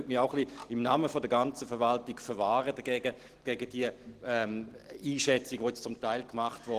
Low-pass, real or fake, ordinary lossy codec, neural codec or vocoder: 14.4 kHz; real; Opus, 16 kbps; none